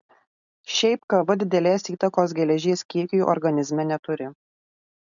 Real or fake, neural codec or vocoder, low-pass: real; none; 7.2 kHz